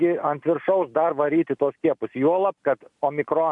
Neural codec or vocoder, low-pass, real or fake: none; 10.8 kHz; real